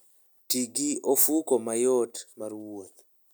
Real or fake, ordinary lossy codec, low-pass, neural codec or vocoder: real; none; none; none